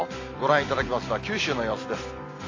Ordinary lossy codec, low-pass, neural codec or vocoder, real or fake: AAC, 32 kbps; 7.2 kHz; none; real